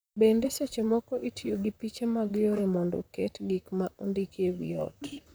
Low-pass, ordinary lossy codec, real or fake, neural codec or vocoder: none; none; fake; vocoder, 44.1 kHz, 128 mel bands, Pupu-Vocoder